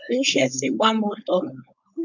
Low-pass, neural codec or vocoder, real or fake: 7.2 kHz; codec, 16 kHz, 4.8 kbps, FACodec; fake